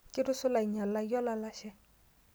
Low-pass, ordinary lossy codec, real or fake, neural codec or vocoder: none; none; real; none